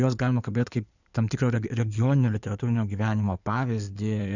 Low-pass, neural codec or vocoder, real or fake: 7.2 kHz; codec, 16 kHz in and 24 kHz out, 2.2 kbps, FireRedTTS-2 codec; fake